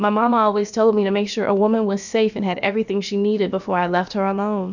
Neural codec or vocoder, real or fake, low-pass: codec, 16 kHz, about 1 kbps, DyCAST, with the encoder's durations; fake; 7.2 kHz